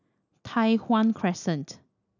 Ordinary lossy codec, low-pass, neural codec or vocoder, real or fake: none; 7.2 kHz; none; real